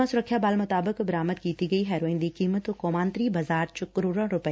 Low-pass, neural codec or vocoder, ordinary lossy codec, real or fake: none; none; none; real